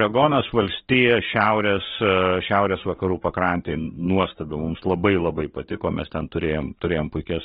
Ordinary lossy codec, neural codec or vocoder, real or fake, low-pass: AAC, 16 kbps; codec, 44.1 kHz, 7.8 kbps, DAC; fake; 19.8 kHz